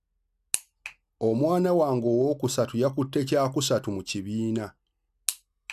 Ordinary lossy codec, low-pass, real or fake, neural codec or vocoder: none; 14.4 kHz; real; none